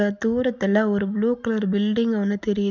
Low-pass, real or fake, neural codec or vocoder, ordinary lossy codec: 7.2 kHz; real; none; none